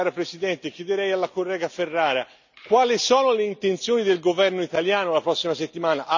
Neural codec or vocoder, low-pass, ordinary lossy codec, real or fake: none; 7.2 kHz; none; real